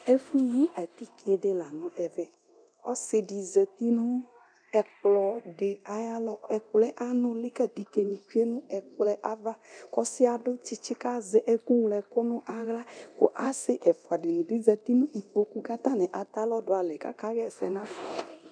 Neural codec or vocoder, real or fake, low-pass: codec, 24 kHz, 0.9 kbps, DualCodec; fake; 9.9 kHz